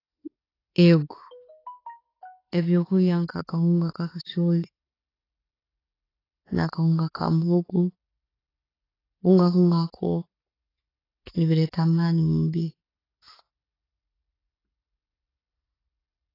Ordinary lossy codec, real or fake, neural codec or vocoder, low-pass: AAC, 24 kbps; real; none; 5.4 kHz